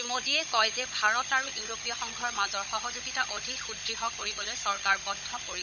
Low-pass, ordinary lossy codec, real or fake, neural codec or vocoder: 7.2 kHz; none; fake; codec, 16 kHz, 16 kbps, FunCodec, trained on Chinese and English, 50 frames a second